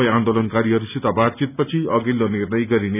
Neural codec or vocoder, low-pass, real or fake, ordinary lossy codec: none; 3.6 kHz; real; none